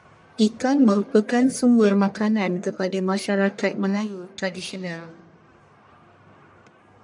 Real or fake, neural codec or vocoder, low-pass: fake; codec, 44.1 kHz, 1.7 kbps, Pupu-Codec; 10.8 kHz